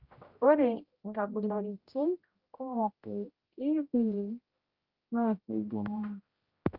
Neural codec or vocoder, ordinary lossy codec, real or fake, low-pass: codec, 16 kHz, 0.5 kbps, X-Codec, HuBERT features, trained on general audio; Opus, 32 kbps; fake; 5.4 kHz